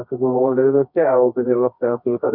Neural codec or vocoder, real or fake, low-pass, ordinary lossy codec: codec, 24 kHz, 0.9 kbps, WavTokenizer, medium music audio release; fake; 5.4 kHz; none